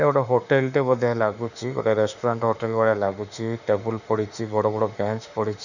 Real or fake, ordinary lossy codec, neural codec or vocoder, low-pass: fake; none; autoencoder, 48 kHz, 32 numbers a frame, DAC-VAE, trained on Japanese speech; 7.2 kHz